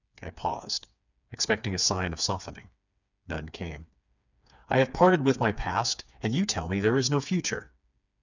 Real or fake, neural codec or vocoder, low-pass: fake; codec, 16 kHz, 4 kbps, FreqCodec, smaller model; 7.2 kHz